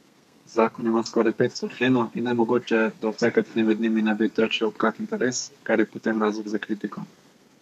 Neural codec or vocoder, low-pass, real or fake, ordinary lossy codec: codec, 32 kHz, 1.9 kbps, SNAC; 14.4 kHz; fake; none